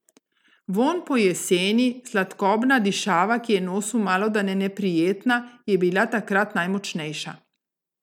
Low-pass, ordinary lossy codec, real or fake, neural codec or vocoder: 19.8 kHz; none; real; none